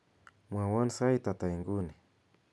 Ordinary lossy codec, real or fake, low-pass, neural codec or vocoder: none; real; none; none